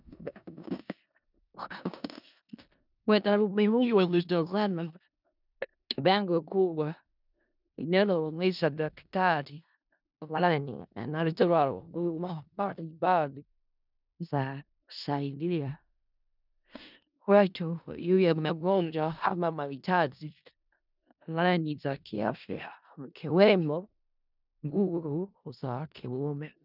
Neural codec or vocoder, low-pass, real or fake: codec, 16 kHz in and 24 kHz out, 0.4 kbps, LongCat-Audio-Codec, four codebook decoder; 5.4 kHz; fake